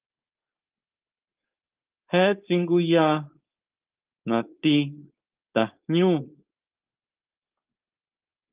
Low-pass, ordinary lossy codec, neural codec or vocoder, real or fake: 3.6 kHz; Opus, 24 kbps; codec, 16 kHz, 4.8 kbps, FACodec; fake